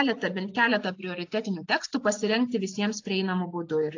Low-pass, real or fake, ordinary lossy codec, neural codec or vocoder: 7.2 kHz; real; AAC, 48 kbps; none